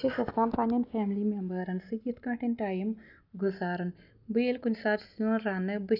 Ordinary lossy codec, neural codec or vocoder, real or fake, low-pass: none; none; real; 5.4 kHz